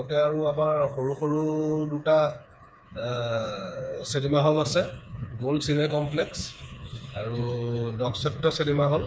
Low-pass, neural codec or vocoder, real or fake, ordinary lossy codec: none; codec, 16 kHz, 4 kbps, FreqCodec, smaller model; fake; none